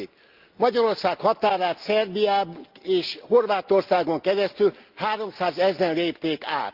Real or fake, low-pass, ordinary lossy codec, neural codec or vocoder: real; 5.4 kHz; Opus, 32 kbps; none